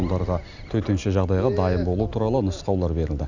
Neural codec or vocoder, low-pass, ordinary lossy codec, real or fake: none; 7.2 kHz; none; real